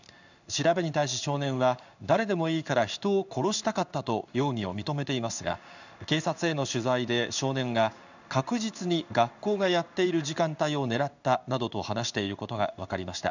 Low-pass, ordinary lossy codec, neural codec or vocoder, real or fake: 7.2 kHz; none; codec, 16 kHz in and 24 kHz out, 1 kbps, XY-Tokenizer; fake